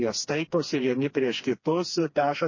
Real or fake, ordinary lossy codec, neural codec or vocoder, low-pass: fake; MP3, 32 kbps; codec, 16 kHz, 2 kbps, FreqCodec, smaller model; 7.2 kHz